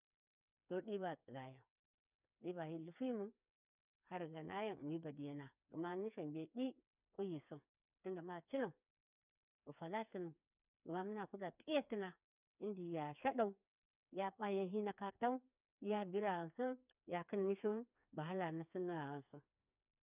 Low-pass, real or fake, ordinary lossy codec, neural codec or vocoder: 3.6 kHz; fake; none; codec, 16 kHz, 4 kbps, FreqCodec, smaller model